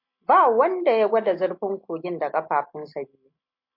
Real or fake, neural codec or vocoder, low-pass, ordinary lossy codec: real; none; 5.4 kHz; MP3, 32 kbps